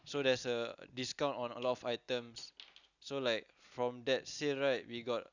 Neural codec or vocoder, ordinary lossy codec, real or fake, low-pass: none; none; real; 7.2 kHz